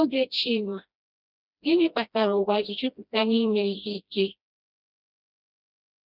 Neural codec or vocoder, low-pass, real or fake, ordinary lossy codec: codec, 16 kHz, 1 kbps, FreqCodec, smaller model; 5.4 kHz; fake; none